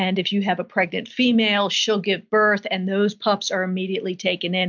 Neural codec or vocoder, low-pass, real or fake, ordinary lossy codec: none; 7.2 kHz; real; MP3, 64 kbps